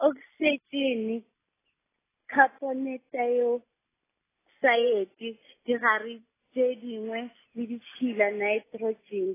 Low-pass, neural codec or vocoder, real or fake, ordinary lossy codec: 3.6 kHz; none; real; AAC, 16 kbps